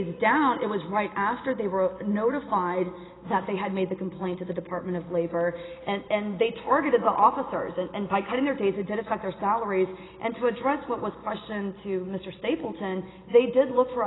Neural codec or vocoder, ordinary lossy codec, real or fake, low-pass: none; AAC, 16 kbps; real; 7.2 kHz